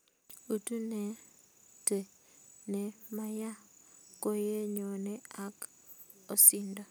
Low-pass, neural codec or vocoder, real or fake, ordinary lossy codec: none; none; real; none